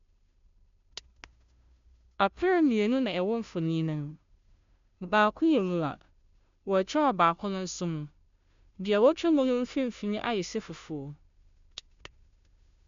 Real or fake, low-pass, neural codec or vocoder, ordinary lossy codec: fake; 7.2 kHz; codec, 16 kHz, 0.5 kbps, FunCodec, trained on Chinese and English, 25 frames a second; MP3, 64 kbps